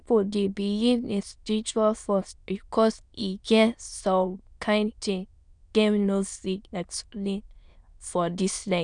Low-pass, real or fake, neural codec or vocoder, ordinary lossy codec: 9.9 kHz; fake; autoencoder, 22.05 kHz, a latent of 192 numbers a frame, VITS, trained on many speakers; none